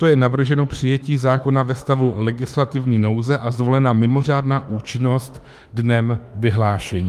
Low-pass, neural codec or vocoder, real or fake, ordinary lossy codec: 14.4 kHz; autoencoder, 48 kHz, 32 numbers a frame, DAC-VAE, trained on Japanese speech; fake; Opus, 32 kbps